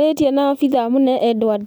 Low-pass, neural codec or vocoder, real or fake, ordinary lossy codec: none; none; real; none